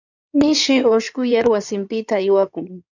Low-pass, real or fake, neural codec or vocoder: 7.2 kHz; fake; codec, 16 kHz in and 24 kHz out, 2.2 kbps, FireRedTTS-2 codec